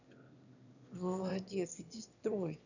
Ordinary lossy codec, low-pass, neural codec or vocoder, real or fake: none; 7.2 kHz; autoencoder, 22.05 kHz, a latent of 192 numbers a frame, VITS, trained on one speaker; fake